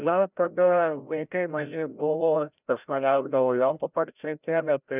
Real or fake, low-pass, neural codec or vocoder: fake; 3.6 kHz; codec, 16 kHz, 0.5 kbps, FreqCodec, larger model